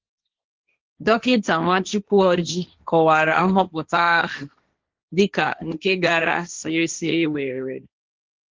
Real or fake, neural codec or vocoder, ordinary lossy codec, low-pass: fake; codec, 16 kHz, 1.1 kbps, Voila-Tokenizer; Opus, 32 kbps; 7.2 kHz